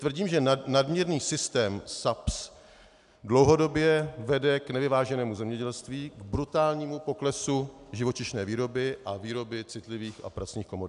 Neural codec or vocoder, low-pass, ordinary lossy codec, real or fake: none; 10.8 kHz; MP3, 96 kbps; real